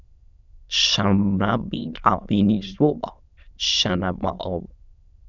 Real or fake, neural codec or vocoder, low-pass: fake; autoencoder, 22.05 kHz, a latent of 192 numbers a frame, VITS, trained on many speakers; 7.2 kHz